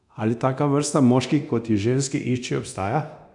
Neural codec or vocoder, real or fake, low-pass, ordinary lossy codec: codec, 24 kHz, 0.9 kbps, DualCodec; fake; 10.8 kHz; none